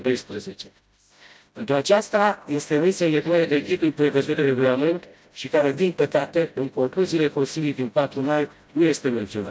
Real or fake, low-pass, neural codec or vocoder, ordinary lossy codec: fake; none; codec, 16 kHz, 0.5 kbps, FreqCodec, smaller model; none